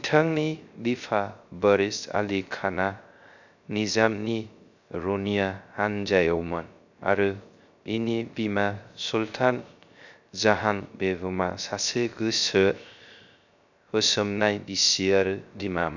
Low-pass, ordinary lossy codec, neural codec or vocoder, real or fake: 7.2 kHz; none; codec, 16 kHz, 0.3 kbps, FocalCodec; fake